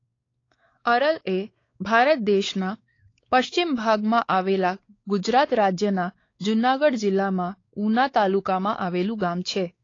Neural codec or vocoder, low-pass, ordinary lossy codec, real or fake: codec, 16 kHz, 4 kbps, X-Codec, WavLM features, trained on Multilingual LibriSpeech; 7.2 kHz; AAC, 32 kbps; fake